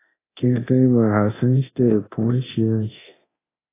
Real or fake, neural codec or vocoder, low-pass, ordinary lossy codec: fake; codec, 24 kHz, 0.9 kbps, DualCodec; 3.6 kHz; AAC, 16 kbps